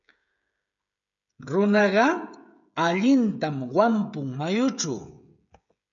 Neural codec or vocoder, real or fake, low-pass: codec, 16 kHz, 16 kbps, FreqCodec, smaller model; fake; 7.2 kHz